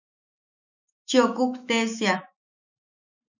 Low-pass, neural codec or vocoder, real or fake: 7.2 kHz; autoencoder, 48 kHz, 128 numbers a frame, DAC-VAE, trained on Japanese speech; fake